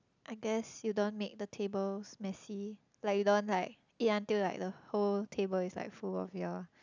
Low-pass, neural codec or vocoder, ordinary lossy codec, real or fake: 7.2 kHz; none; none; real